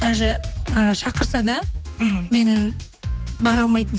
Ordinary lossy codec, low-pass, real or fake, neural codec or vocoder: none; none; fake; codec, 16 kHz, 2 kbps, X-Codec, HuBERT features, trained on general audio